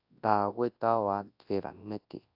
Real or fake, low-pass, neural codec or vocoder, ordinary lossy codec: fake; 5.4 kHz; codec, 24 kHz, 0.9 kbps, WavTokenizer, large speech release; AAC, 48 kbps